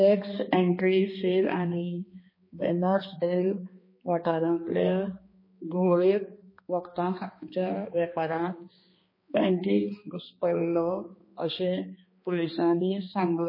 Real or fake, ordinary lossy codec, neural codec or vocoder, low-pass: fake; MP3, 24 kbps; codec, 16 kHz, 2 kbps, X-Codec, HuBERT features, trained on balanced general audio; 5.4 kHz